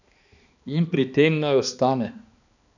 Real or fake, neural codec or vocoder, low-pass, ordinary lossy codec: fake; codec, 16 kHz, 2 kbps, X-Codec, HuBERT features, trained on balanced general audio; 7.2 kHz; none